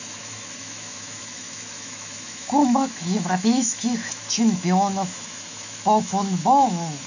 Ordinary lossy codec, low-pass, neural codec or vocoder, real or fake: none; 7.2 kHz; autoencoder, 48 kHz, 128 numbers a frame, DAC-VAE, trained on Japanese speech; fake